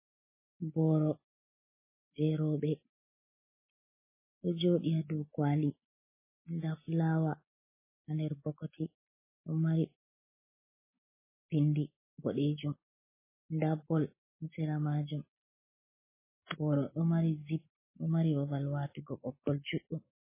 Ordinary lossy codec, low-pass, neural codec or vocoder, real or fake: MP3, 16 kbps; 3.6 kHz; none; real